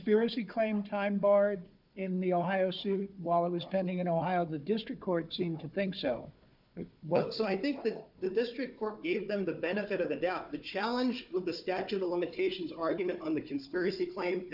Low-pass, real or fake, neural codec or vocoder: 5.4 kHz; fake; codec, 16 kHz, 4 kbps, FunCodec, trained on Chinese and English, 50 frames a second